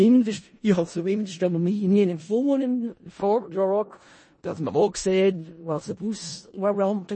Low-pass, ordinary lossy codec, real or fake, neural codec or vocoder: 9.9 kHz; MP3, 32 kbps; fake; codec, 16 kHz in and 24 kHz out, 0.4 kbps, LongCat-Audio-Codec, four codebook decoder